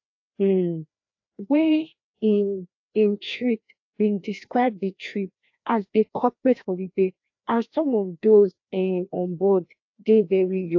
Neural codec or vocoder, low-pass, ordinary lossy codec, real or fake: codec, 16 kHz, 1 kbps, FreqCodec, larger model; 7.2 kHz; AAC, 48 kbps; fake